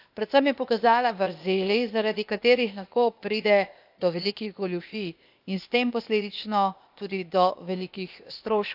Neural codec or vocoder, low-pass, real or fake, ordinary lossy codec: codec, 16 kHz, 0.8 kbps, ZipCodec; 5.4 kHz; fake; none